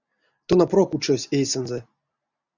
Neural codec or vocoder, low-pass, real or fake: none; 7.2 kHz; real